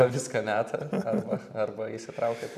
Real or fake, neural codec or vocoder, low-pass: fake; vocoder, 44.1 kHz, 128 mel bands every 512 samples, BigVGAN v2; 14.4 kHz